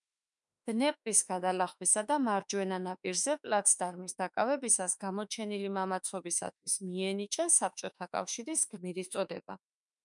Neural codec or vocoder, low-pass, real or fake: autoencoder, 48 kHz, 32 numbers a frame, DAC-VAE, trained on Japanese speech; 10.8 kHz; fake